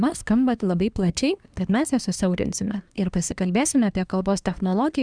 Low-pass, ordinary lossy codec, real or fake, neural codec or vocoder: 9.9 kHz; Opus, 64 kbps; fake; codec, 24 kHz, 1 kbps, SNAC